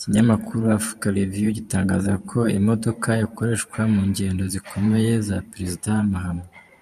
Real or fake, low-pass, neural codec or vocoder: fake; 14.4 kHz; vocoder, 44.1 kHz, 128 mel bands every 512 samples, BigVGAN v2